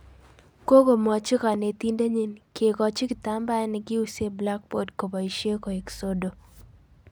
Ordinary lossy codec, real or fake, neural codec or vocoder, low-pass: none; real; none; none